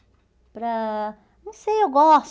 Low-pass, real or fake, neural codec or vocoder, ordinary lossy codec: none; real; none; none